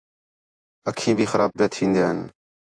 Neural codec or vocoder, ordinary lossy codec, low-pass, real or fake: vocoder, 48 kHz, 128 mel bands, Vocos; AAC, 64 kbps; 9.9 kHz; fake